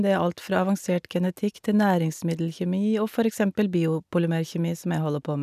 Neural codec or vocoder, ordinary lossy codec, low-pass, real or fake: none; none; 14.4 kHz; real